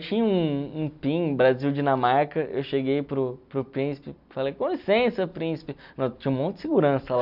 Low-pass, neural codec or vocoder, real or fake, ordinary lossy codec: 5.4 kHz; none; real; none